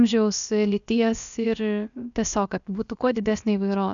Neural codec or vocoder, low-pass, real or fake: codec, 16 kHz, about 1 kbps, DyCAST, with the encoder's durations; 7.2 kHz; fake